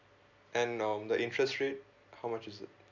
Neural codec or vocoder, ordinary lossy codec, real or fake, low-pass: none; none; real; 7.2 kHz